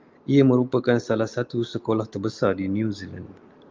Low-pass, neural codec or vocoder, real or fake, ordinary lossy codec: 7.2 kHz; none; real; Opus, 24 kbps